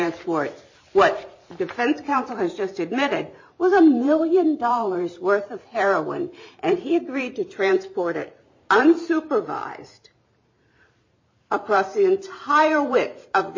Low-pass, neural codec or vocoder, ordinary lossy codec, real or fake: 7.2 kHz; vocoder, 44.1 kHz, 128 mel bands every 512 samples, BigVGAN v2; MP3, 32 kbps; fake